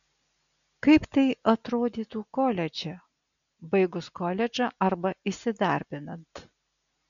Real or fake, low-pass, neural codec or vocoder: real; 7.2 kHz; none